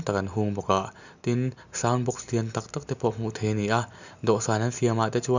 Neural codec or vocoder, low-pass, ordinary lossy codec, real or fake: none; 7.2 kHz; none; real